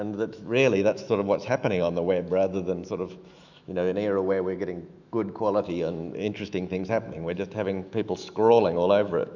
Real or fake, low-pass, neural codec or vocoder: fake; 7.2 kHz; autoencoder, 48 kHz, 128 numbers a frame, DAC-VAE, trained on Japanese speech